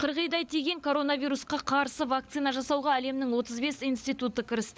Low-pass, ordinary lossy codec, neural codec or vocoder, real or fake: none; none; codec, 16 kHz, 16 kbps, FunCodec, trained on LibriTTS, 50 frames a second; fake